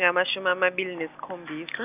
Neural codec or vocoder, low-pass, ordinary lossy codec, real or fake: none; 3.6 kHz; none; real